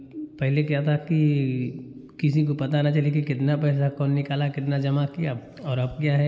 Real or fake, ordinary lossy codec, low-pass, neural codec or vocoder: real; none; none; none